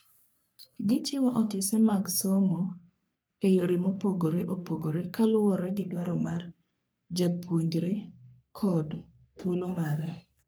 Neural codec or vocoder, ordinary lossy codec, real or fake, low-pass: codec, 44.1 kHz, 3.4 kbps, Pupu-Codec; none; fake; none